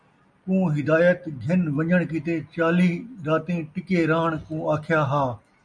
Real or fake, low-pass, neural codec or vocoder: real; 9.9 kHz; none